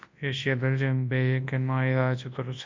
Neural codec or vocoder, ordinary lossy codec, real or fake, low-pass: codec, 24 kHz, 0.9 kbps, WavTokenizer, large speech release; MP3, 48 kbps; fake; 7.2 kHz